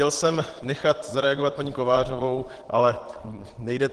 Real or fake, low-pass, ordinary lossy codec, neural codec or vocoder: fake; 9.9 kHz; Opus, 16 kbps; vocoder, 22.05 kHz, 80 mel bands, WaveNeXt